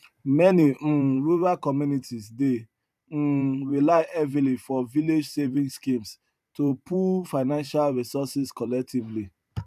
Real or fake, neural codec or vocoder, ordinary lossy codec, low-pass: fake; vocoder, 44.1 kHz, 128 mel bands every 512 samples, BigVGAN v2; none; 14.4 kHz